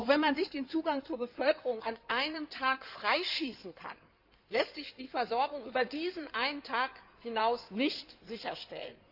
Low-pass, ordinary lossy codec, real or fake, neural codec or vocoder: 5.4 kHz; none; fake; codec, 16 kHz in and 24 kHz out, 2.2 kbps, FireRedTTS-2 codec